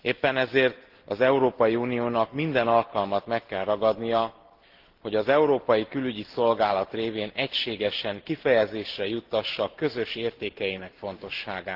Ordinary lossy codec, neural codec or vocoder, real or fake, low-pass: Opus, 16 kbps; none; real; 5.4 kHz